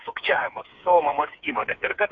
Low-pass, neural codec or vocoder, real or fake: 7.2 kHz; codec, 16 kHz, 4 kbps, FreqCodec, smaller model; fake